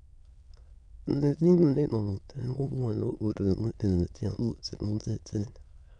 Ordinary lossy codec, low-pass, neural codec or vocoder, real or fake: none; 9.9 kHz; autoencoder, 22.05 kHz, a latent of 192 numbers a frame, VITS, trained on many speakers; fake